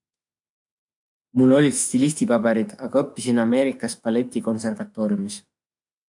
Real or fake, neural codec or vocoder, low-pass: fake; autoencoder, 48 kHz, 32 numbers a frame, DAC-VAE, trained on Japanese speech; 10.8 kHz